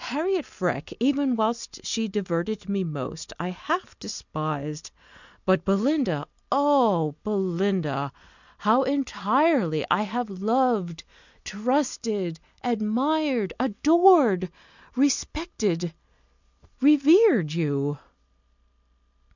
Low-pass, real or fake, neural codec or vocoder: 7.2 kHz; real; none